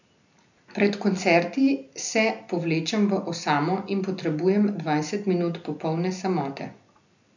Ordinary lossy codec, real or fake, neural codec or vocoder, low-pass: none; real; none; 7.2 kHz